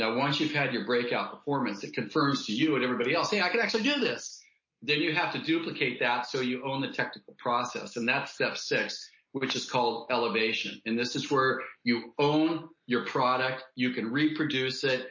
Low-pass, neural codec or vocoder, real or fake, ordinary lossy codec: 7.2 kHz; none; real; MP3, 32 kbps